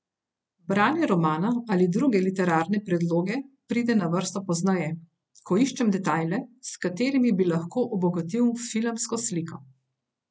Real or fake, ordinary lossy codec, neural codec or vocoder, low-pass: real; none; none; none